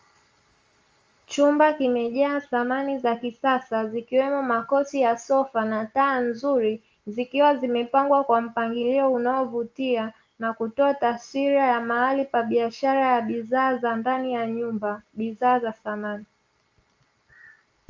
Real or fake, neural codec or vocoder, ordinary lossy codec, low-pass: real; none; Opus, 32 kbps; 7.2 kHz